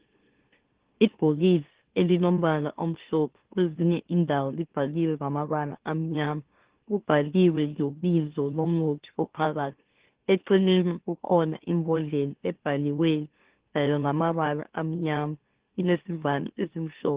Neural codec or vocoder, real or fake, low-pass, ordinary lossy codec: autoencoder, 44.1 kHz, a latent of 192 numbers a frame, MeloTTS; fake; 3.6 kHz; Opus, 16 kbps